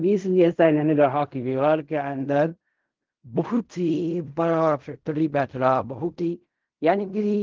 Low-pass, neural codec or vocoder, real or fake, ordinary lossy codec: 7.2 kHz; codec, 16 kHz in and 24 kHz out, 0.4 kbps, LongCat-Audio-Codec, fine tuned four codebook decoder; fake; Opus, 32 kbps